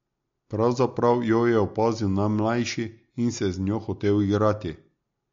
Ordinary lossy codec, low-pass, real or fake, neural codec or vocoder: MP3, 48 kbps; 7.2 kHz; real; none